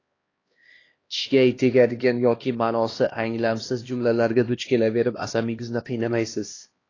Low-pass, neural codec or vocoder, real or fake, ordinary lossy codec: 7.2 kHz; codec, 16 kHz, 1 kbps, X-Codec, HuBERT features, trained on LibriSpeech; fake; AAC, 32 kbps